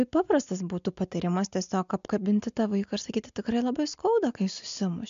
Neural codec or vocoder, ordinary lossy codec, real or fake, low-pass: none; MP3, 64 kbps; real; 7.2 kHz